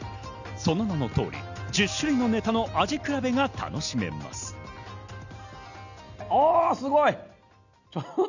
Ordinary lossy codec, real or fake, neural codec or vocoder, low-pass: none; real; none; 7.2 kHz